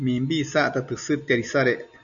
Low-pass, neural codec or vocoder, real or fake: 7.2 kHz; none; real